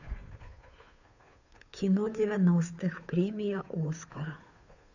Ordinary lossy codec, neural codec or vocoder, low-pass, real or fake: none; codec, 16 kHz, 2 kbps, FunCodec, trained on Chinese and English, 25 frames a second; 7.2 kHz; fake